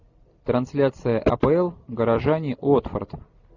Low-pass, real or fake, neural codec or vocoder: 7.2 kHz; fake; vocoder, 44.1 kHz, 128 mel bands every 256 samples, BigVGAN v2